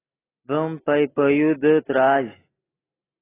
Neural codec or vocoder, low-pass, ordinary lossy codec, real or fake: none; 3.6 kHz; AAC, 16 kbps; real